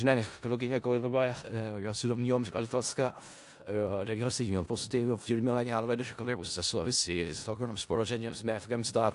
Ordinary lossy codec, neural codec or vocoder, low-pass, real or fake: AAC, 64 kbps; codec, 16 kHz in and 24 kHz out, 0.4 kbps, LongCat-Audio-Codec, four codebook decoder; 10.8 kHz; fake